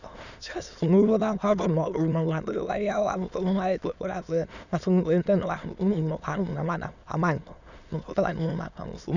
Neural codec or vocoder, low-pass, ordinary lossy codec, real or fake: autoencoder, 22.05 kHz, a latent of 192 numbers a frame, VITS, trained on many speakers; 7.2 kHz; none; fake